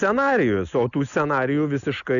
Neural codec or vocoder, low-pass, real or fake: none; 7.2 kHz; real